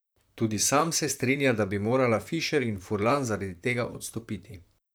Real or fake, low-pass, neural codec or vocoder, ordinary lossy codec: fake; none; vocoder, 44.1 kHz, 128 mel bands, Pupu-Vocoder; none